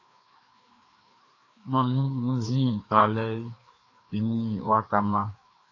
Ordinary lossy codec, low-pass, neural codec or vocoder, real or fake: AAC, 32 kbps; 7.2 kHz; codec, 16 kHz, 2 kbps, FreqCodec, larger model; fake